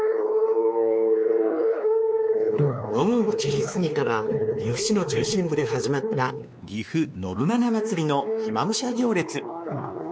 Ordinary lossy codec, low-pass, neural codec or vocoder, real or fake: none; none; codec, 16 kHz, 2 kbps, X-Codec, WavLM features, trained on Multilingual LibriSpeech; fake